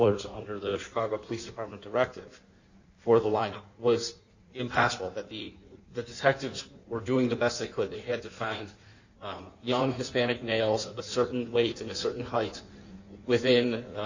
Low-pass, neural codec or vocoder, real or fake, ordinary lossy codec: 7.2 kHz; codec, 16 kHz in and 24 kHz out, 1.1 kbps, FireRedTTS-2 codec; fake; Opus, 64 kbps